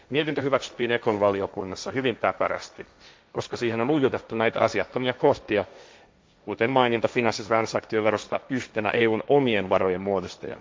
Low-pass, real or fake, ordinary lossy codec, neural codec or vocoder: none; fake; none; codec, 16 kHz, 1.1 kbps, Voila-Tokenizer